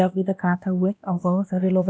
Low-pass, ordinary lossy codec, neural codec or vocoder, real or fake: none; none; codec, 16 kHz, 1 kbps, X-Codec, WavLM features, trained on Multilingual LibriSpeech; fake